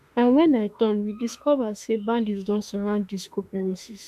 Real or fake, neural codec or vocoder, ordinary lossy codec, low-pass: fake; autoencoder, 48 kHz, 32 numbers a frame, DAC-VAE, trained on Japanese speech; none; 14.4 kHz